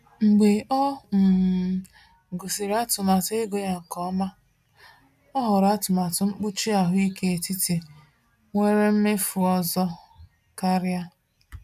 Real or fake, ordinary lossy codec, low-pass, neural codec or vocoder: real; none; 14.4 kHz; none